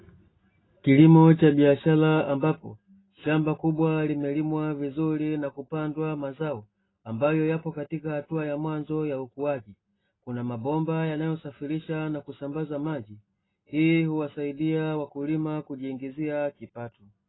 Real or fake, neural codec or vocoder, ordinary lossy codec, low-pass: real; none; AAC, 16 kbps; 7.2 kHz